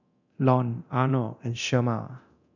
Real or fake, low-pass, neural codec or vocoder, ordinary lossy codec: fake; 7.2 kHz; codec, 24 kHz, 0.9 kbps, DualCodec; none